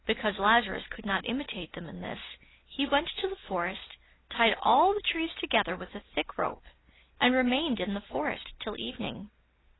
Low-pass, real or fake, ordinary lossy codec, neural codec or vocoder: 7.2 kHz; real; AAC, 16 kbps; none